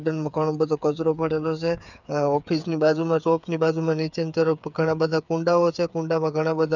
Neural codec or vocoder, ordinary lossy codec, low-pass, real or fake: codec, 16 kHz, 8 kbps, FreqCodec, smaller model; none; 7.2 kHz; fake